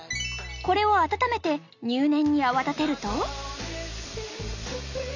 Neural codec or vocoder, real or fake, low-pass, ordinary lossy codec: none; real; 7.2 kHz; none